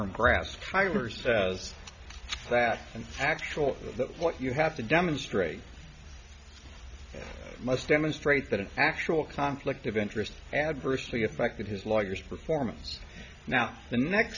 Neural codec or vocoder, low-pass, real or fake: none; 7.2 kHz; real